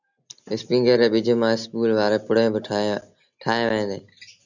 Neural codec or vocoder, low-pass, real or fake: none; 7.2 kHz; real